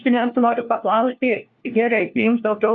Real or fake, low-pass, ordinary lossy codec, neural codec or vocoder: fake; 7.2 kHz; AAC, 48 kbps; codec, 16 kHz, 1 kbps, FunCodec, trained on LibriTTS, 50 frames a second